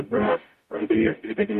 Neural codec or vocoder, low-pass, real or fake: codec, 44.1 kHz, 0.9 kbps, DAC; 14.4 kHz; fake